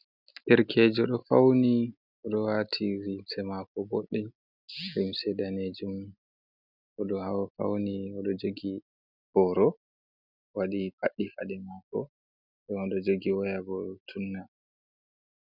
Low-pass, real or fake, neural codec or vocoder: 5.4 kHz; real; none